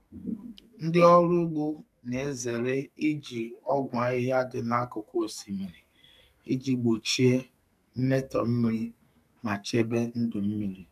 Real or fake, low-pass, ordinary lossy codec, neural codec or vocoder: fake; 14.4 kHz; none; codec, 44.1 kHz, 2.6 kbps, SNAC